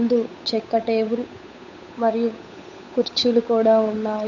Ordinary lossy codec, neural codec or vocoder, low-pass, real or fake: none; codec, 16 kHz, 8 kbps, FunCodec, trained on Chinese and English, 25 frames a second; 7.2 kHz; fake